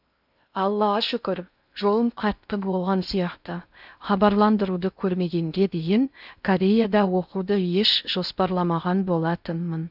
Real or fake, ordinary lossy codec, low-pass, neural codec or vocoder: fake; none; 5.4 kHz; codec, 16 kHz in and 24 kHz out, 0.6 kbps, FocalCodec, streaming, 2048 codes